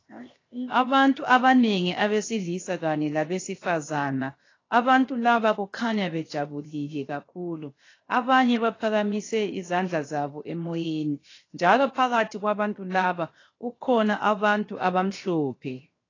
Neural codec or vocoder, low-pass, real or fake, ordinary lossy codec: codec, 16 kHz, 0.7 kbps, FocalCodec; 7.2 kHz; fake; AAC, 32 kbps